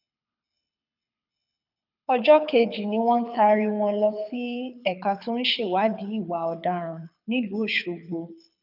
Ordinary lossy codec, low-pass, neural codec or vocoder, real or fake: none; 5.4 kHz; codec, 24 kHz, 6 kbps, HILCodec; fake